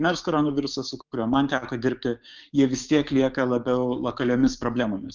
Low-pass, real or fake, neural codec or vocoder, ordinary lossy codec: 7.2 kHz; fake; codec, 16 kHz, 6 kbps, DAC; Opus, 24 kbps